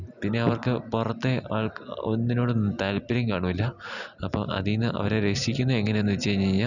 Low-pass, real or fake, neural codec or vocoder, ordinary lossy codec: 7.2 kHz; real; none; none